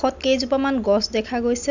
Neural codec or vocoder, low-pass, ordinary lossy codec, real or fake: none; 7.2 kHz; none; real